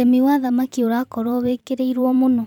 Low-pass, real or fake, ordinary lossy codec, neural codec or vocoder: 19.8 kHz; fake; none; vocoder, 44.1 kHz, 128 mel bands every 512 samples, BigVGAN v2